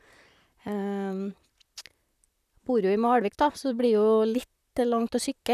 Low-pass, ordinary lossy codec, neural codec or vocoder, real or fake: 14.4 kHz; none; vocoder, 44.1 kHz, 128 mel bands, Pupu-Vocoder; fake